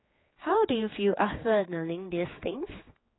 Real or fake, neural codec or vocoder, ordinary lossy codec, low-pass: fake; codec, 16 kHz, 4 kbps, X-Codec, HuBERT features, trained on general audio; AAC, 16 kbps; 7.2 kHz